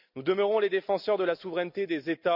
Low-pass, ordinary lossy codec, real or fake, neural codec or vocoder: 5.4 kHz; none; real; none